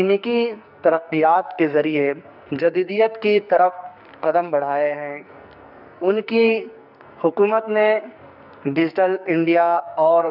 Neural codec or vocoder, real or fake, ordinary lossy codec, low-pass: codec, 44.1 kHz, 2.6 kbps, SNAC; fake; none; 5.4 kHz